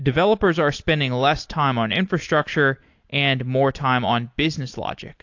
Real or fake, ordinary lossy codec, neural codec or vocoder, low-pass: real; AAC, 48 kbps; none; 7.2 kHz